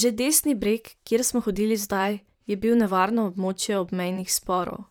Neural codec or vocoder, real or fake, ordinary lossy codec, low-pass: vocoder, 44.1 kHz, 128 mel bands, Pupu-Vocoder; fake; none; none